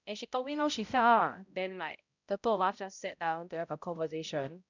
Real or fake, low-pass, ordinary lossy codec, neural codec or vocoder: fake; 7.2 kHz; none; codec, 16 kHz, 0.5 kbps, X-Codec, HuBERT features, trained on balanced general audio